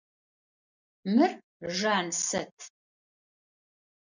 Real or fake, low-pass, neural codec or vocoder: real; 7.2 kHz; none